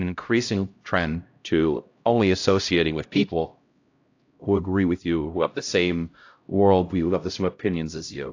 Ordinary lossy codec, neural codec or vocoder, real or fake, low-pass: AAC, 48 kbps; codec, 16 kHz, 0.5 kbps, X-Codec, HuBERT features, trained on LibriSpeech; fake; 7.2 kHz